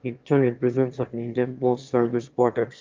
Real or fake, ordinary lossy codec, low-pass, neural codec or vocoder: fake; Opus, 24 kbps; 7.2 kHz; autoencoder, 22.05 kHz, a latent of 192 numbers a frame, VITS, trained on one speaker